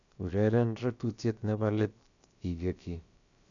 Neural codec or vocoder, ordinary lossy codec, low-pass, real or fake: codec, 16 kHz, about 1 kbps, DyCAST, with the encoder's durations; none; 7.2 kHz; fake